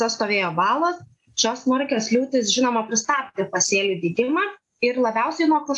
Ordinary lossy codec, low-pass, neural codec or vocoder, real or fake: AAC, 64 kbps; 10.8 kHz; none; real